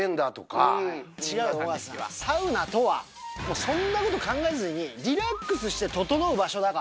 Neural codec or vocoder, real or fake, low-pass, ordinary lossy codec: none; real; none; none